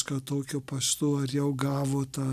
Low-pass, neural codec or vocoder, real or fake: 14.4 kHz; none; real